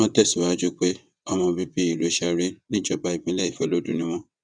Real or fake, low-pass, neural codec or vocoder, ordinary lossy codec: fake; 9.9 kHz; vocoder, 22.05 kHz, 80 mel bands, WaveNeXt; none